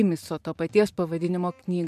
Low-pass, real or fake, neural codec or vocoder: 14.4 kHz; fake; vocoder, 44.1 kHz, 128 mel bands every 512 samples, BigVGAN v2